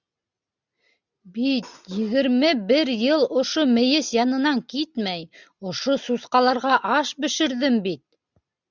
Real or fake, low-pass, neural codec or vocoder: real; 7.2 kHz; none